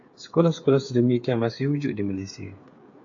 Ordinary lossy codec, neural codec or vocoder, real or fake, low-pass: AAC, 48 kbps; codec, 16 kHz, 8 kbps, FreqCodec, smaller model; fake; 7.2 kHz